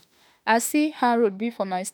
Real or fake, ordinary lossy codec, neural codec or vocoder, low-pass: fake; none; autoencoder, 48 kHz, 32 numbers a frame, DAC-VAE, trained on Japanese speech; none